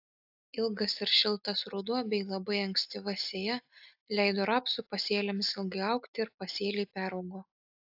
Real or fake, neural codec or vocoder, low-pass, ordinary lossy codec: real; none; 5.4 kHz; AAC, 48 kbps